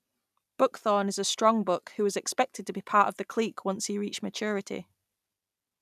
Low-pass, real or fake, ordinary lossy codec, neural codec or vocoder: 14.4 kHz; real; none; none